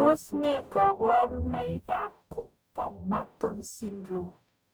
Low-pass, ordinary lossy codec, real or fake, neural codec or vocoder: none; none; fake; codec, 44.1 kHz, 0.9 kbps, DAC